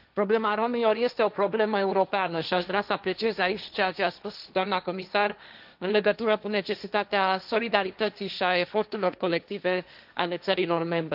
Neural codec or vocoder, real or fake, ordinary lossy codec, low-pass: codec, 16 kHz, 1.1 kbps, Voila-Tokenizer; fake; none; 5.4 kHz